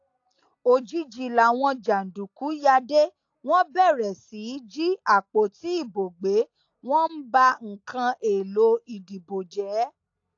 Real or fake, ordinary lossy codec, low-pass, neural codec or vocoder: real; AAC, 48 kbps; 7.2 kHz; none